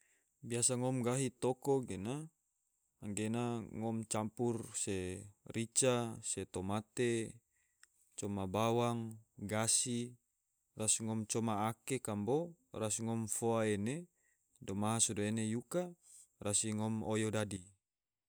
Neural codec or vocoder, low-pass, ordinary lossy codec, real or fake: none; none; none; real